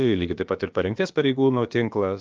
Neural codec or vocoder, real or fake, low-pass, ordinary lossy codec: codec, 16 kHz, about 1 kbps, DyCAST, with the encoder's durations; fake; 7.2 kHz; Opus, 24 kbps